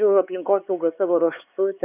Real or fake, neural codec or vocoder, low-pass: fake; codec, 16 kHz, 4 kbps, X-Codec, HuBERT features, trained on LibriSpeech; 3.6 kHz